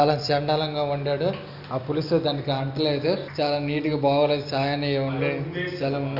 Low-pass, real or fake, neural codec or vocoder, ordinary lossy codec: 5.4 kHz; real; none; none